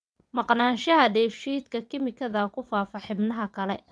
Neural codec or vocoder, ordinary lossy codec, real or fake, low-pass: vocoder, 22.05 kHz, 80 mel bands, WaveNeXt; none; fake; none